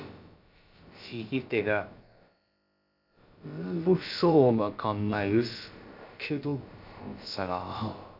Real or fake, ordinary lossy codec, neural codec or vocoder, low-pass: fake; none; codec, 16 kHz, about 1 kbps, DyCAST, with the encoder's durations; 5.4 kHz